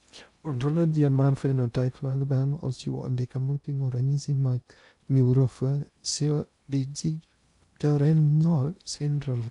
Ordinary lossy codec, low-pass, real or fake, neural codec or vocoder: none; 10.8 kHz; fake; codec, 16 kHz in and 24 kHz out, 0.6 kbps, FocalCodec, streaming, 2048 codes